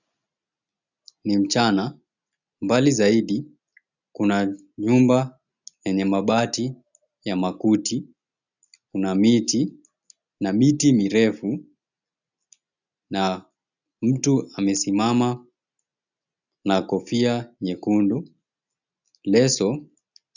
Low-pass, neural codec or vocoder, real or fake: 7.2 kHz; none; real